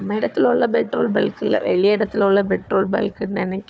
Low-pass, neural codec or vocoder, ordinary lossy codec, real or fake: none; codec, 16 kHz, 4 kbps, FunCodec, trained on Chinese and English, 50 frames a second; none; fake